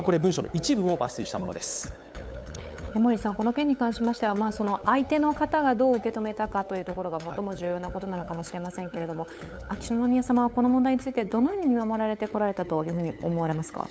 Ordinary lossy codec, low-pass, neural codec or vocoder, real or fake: none; none; codec, 16 kHz, 8 kbps, FunCodec, trained on LibriTTS, 25 frames a second; fake